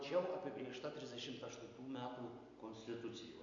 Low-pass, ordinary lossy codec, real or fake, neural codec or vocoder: 7.2 kHz; MP3, 64 kbps; real; none